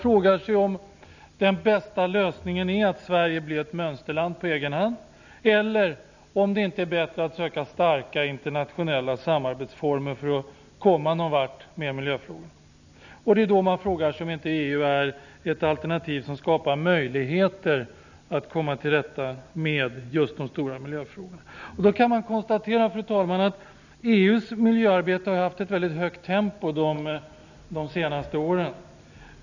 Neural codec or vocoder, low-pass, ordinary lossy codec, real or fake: none; 7.2 kHz; none; real